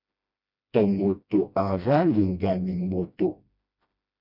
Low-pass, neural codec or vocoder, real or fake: 5.4 kHz; codec, 16 kHz, 1 kbps, FreqCodec, smaller model; fake